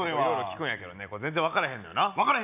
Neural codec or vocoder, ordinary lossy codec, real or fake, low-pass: none; none; real; 3.6 kHz